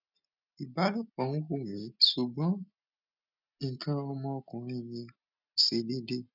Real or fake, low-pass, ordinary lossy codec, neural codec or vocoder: real; 5.4 kHz; none; none